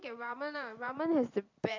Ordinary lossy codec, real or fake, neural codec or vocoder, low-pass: MP3, 64 kbps; fake; vocoder, 44.1 kHz, 128 mel bands every 512 samples, BigVGAN v2; 7.2 kHz